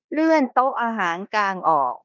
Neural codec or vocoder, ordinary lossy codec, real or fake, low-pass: codec, 16 kHz in and 24 kHz out, 0.9 kbps, LongCat-Audio-Codec, four codebook decoder; none; fake; 7.2 kHz